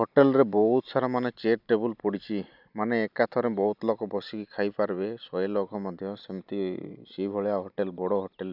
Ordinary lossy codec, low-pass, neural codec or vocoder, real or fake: none; 5.4 kHz; none; real